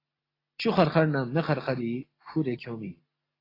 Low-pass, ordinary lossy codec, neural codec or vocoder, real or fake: 5.4 kHz; AAC, 24 kbps; none; real